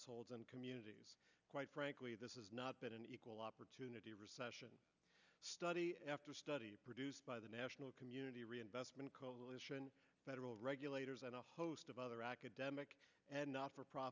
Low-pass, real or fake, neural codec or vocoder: 7.2 kHz; real; none